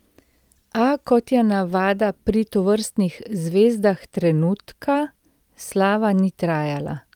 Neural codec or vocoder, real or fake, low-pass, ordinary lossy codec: none; real; 19.8 kHz; Opus, 32 kbps